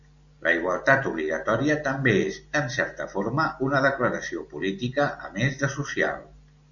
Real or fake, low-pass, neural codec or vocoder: real; 7.2 kHz; none